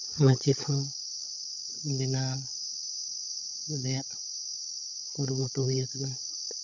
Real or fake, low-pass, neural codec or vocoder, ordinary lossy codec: fake; 7.2 kHz; codec, 16 kHz, 16 kbps, FunCodec, trained on LibriTTS, 50 frames a second; none